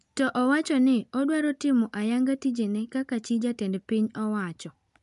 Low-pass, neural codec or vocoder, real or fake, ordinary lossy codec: 10.8 kHz; none; real; none